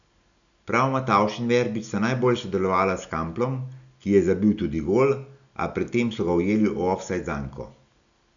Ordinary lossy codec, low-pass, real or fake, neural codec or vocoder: none; 7.2 kHz; real; none